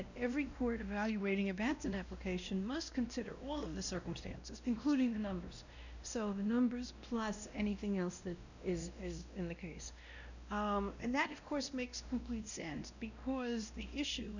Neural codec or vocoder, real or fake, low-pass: codec, 16 kHz, 1 kbps, X-Codec, WavLM features, trained on Multilingual LibriSpeech; fake; 7.2 kHz